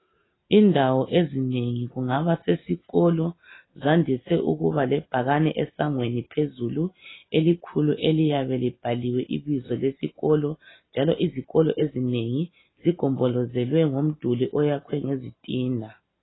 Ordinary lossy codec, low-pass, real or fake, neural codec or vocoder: AAC, 16 kbps; 7.2 kHz; real; none